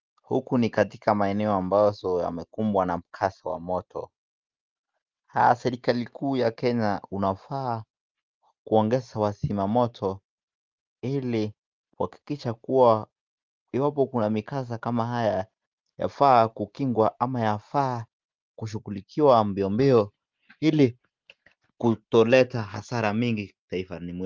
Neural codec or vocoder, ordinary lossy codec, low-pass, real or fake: none; Opus, 32 kbps; 7.2 kHz; real